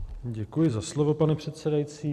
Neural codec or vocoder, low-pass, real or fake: vocoder, 44.1 kHz, 128 mel bands every 256 samples, BigVGAN v2; 14.4 kHz; fake